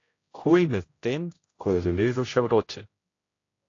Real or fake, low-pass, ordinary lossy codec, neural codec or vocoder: fake; 7.2 kHz; AAC, 32 kbps; codec, 16 kHz, 0.5 kbps, X-Codec, HuBERT features, trained on general audio